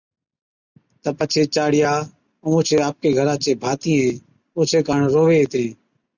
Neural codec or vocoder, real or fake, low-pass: none; real; 7.2 kHz